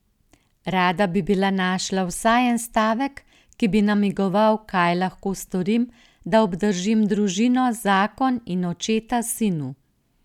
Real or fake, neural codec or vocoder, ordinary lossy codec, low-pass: real; none; none; 19.8 kHz